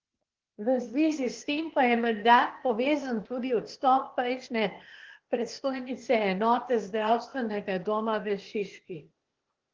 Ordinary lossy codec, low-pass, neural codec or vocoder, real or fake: Opus, 16 kbps; 7.2 kHz; codec, 16 kHz, 0.8 kbps, ZipCodec; fake